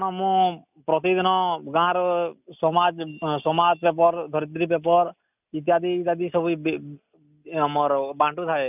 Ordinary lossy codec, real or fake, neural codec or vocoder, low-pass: none; real; none; 3.6 kHz